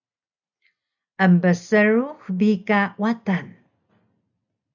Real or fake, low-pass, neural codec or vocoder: real; 7.2 kHz; none